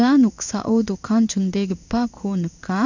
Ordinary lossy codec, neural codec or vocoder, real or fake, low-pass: none; codec, 16 kHz in and 24 kHz out, 1 kbps, XY-Tokenizer; fake; 7.2 kHz